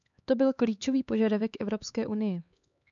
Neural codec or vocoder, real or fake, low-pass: codec, 16 kHz, 4 kbps, X-Codec, HuBERT features, trained on LibriSpeech; fake; 7.2 kHz